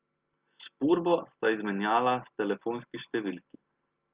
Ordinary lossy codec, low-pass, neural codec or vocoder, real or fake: Opus, 32 kbps; 3.6 kHz; none; real